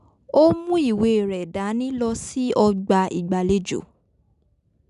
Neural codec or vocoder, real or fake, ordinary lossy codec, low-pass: none; real; none; 10.8 kHz